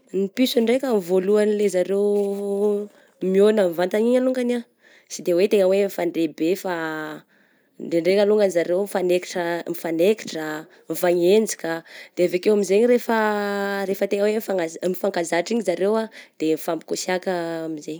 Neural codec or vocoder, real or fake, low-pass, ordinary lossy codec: none; real; none; none